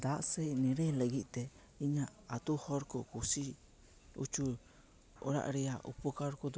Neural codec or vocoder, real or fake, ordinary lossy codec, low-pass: none; real; none; none